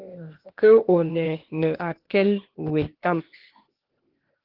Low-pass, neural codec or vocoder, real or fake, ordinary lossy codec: 5.4 kHz; codec, 16 kHz, 0.8 kbps, ZipCodec; fake; Opus, 16 kbps